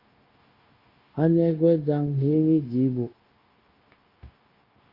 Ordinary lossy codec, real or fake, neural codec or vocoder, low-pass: Opus, 32 kbps; fake; codec, 16 kHz, 0.9 kbps, LongCat-Audio-Codec; 5.4 kHz